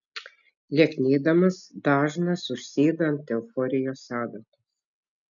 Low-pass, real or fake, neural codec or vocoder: 7.2 kHz; real; none